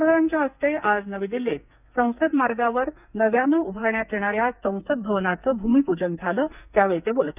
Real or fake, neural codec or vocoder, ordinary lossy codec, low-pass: fake; codec, 44.1 kHz, 2.6 kbps, SNAC; none; 3.6 kHz